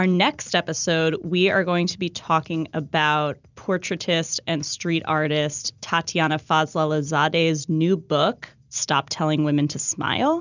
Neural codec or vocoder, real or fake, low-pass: none; real; 7.2 kHz